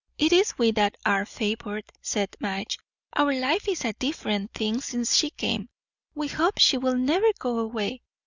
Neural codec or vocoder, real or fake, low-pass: none; real; 7.2 kHz